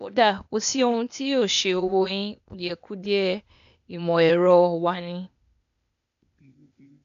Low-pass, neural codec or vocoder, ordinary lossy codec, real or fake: 7.2 kHz; codec, 16 kHz, 0.8 kbps, ZipCodec; none; fake